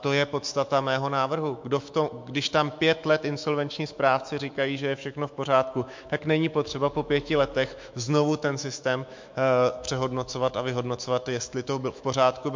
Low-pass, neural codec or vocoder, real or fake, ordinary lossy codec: 7.2 kHz; autoencoder, 48 kHz, 128 numbers a frame, DAC-VAE, trained on Japanese speech; fake; MP3, 48 kbps